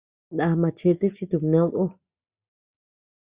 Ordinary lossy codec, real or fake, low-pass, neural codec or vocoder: Opus, 64 kbps; real; 3.6 kHz; none